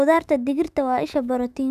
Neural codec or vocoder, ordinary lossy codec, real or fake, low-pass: autoencoder, 48 kHz, 128 numbers a frame, DAC-VAE, trained on Japanese speech; none; fake; 14.4 kHz